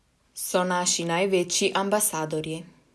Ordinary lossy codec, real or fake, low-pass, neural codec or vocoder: none; real; none; none